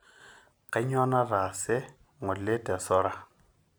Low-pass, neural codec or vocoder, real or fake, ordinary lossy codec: none; none; real; none